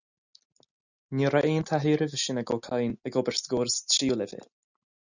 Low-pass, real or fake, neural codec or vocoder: 7.2 kHz; real; none